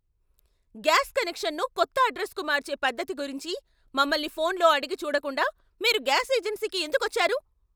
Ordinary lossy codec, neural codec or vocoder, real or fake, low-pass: none; none; real; none